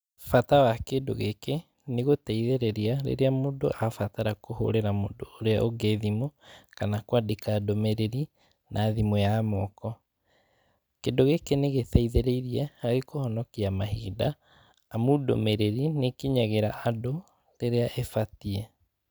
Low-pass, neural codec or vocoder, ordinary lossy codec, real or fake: none; none; none; real